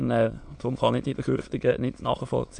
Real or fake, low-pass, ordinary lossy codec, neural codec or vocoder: fake; 9.9 kHz; MP3, 64 kbps; autoencoder, 22.05 kHz, a latent of 192 numbers a frame, VITS, trained on many speakers